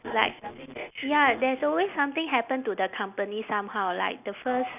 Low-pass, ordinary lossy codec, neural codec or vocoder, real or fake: 3.6 kHz; none; none; real